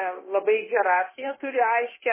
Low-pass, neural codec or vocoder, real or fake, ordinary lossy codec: 3.6 kHz; codec, 16 kHz, 0.9 kbps, LongCat-Audio-Codec; fake; MP3, 16 kbps